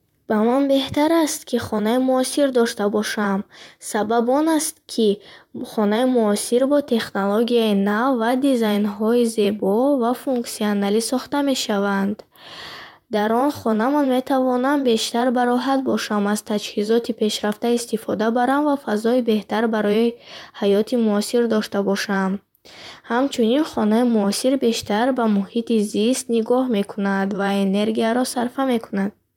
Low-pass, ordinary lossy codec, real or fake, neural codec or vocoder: 19.8 kHz; none; fake; vocoder, 44.1 kHz, 128 mel bands, Pupu-Vocoder